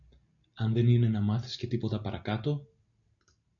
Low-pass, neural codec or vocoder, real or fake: 7.2 kHz; none; real